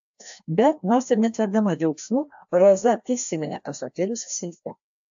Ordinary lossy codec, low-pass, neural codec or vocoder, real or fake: AAC, 64 kbps; 7.2 kHz; codec, 16 kHz, 1 kbps, FreqCodec, larger model; fake